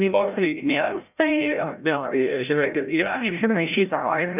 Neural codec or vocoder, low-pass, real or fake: codec, 16 kHz, 0.5 kbps, FreqCodec, larger model; 3.6 kHz; fake